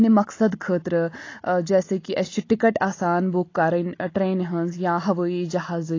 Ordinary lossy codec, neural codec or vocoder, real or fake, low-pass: AAC, 32 kbps; none; real; 7.2 kHz